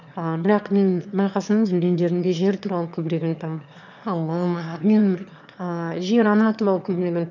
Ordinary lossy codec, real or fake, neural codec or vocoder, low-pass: none; fake; autoencoder, 22.05 kHz, a latent of 192 numbers a frame, VITS, trained on one speaker; 7.2 kHz